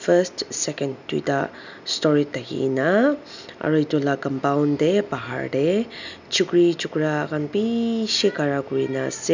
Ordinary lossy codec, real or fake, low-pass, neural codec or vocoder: none; real; 7.2 kHz; none